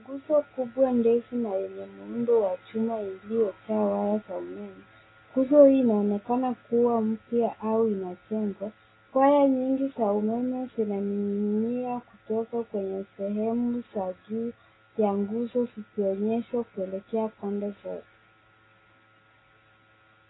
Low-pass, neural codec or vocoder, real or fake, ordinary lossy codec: 7.2 kHz; none; real; AAC, 16 kbps